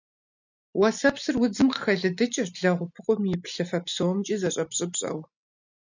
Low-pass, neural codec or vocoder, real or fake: 7.2 kHz; none; real